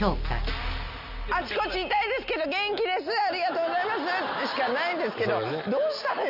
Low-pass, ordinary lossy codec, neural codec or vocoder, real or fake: 5.4 kHz; none; none; real